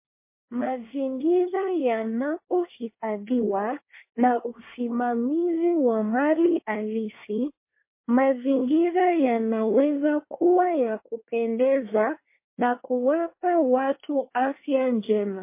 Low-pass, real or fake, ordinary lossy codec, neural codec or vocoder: 3.6 kHz; fake; MP3, 24 kbps; codec, 24 kHz, 1 kbps, SNAC